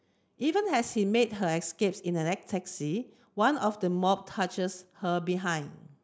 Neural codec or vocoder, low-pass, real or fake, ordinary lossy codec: none; none; real; none